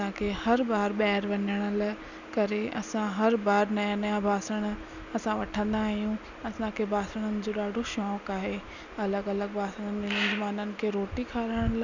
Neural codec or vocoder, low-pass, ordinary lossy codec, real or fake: none; 7.2 kHz; none; real